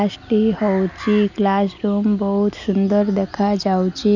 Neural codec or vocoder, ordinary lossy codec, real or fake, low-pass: none; none; real; 7.2 kHz